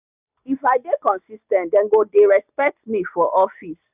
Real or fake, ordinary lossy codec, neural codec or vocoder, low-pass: real; none; none; 3.6 kHz